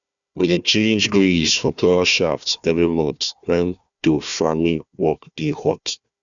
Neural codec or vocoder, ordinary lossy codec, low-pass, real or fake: codec, 16 kHz, 1 kbps, FunCodec, trained on Chinese and English, 50 frames a second; none; 7.2 kHz; fake